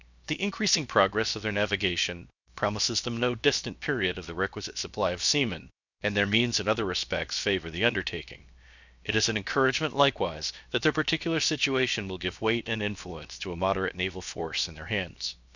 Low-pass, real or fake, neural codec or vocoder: 7.2 kHz; fake; codec, 16 kHz, 0.7 kbps, FocalCodec